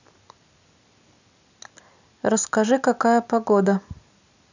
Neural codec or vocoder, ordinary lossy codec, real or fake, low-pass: none; none; real; 7.2 kHz